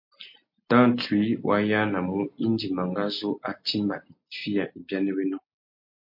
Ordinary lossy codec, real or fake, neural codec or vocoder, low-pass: MP3, 32 kbps; real; none; 5.4 kHz